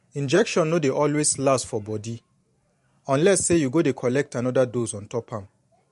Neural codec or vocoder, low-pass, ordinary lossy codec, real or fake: vocoder, 44.1 kHz, 128 mel bands every 512 samples, BigVGAN v2; 14.4 kHz; MP3, 48 kbps; fake